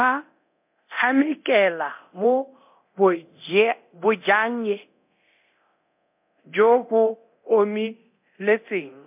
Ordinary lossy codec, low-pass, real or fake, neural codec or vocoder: MP3, 32 kbps; 3.6 kHz; fake; codec, 24 kHz, 0.9 kbps, DualCodec